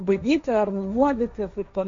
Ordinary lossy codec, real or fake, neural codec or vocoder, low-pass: MP3, 48 kbps; fake; codec, 16 kHz, 1.1 kbps, Voila-Tokenizer; 7.2 kHz